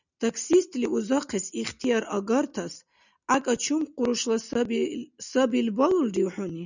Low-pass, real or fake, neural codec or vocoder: 7.2 kHz; real; none